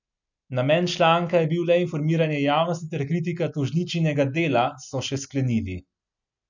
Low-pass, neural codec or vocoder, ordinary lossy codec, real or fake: 7.2 kHz; none; none; real